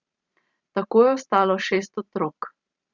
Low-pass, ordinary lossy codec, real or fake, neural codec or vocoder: 7.2 kHz; Opus, 64 kbps; fake; vocoder, 44.1 kHz, 128 mel bands every 512 samples, BigVGAN v2